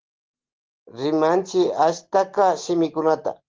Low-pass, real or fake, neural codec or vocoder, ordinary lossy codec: 7.2 kHz; real; none; Opus, 16 kbps